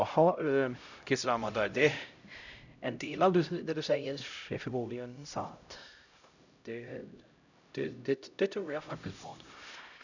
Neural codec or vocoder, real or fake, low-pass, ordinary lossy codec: codec, 16 kHz, 0.5 kbps, X-Codec, HuBERT features, trained on LibriSpeech; fake; 7.2 kHz; none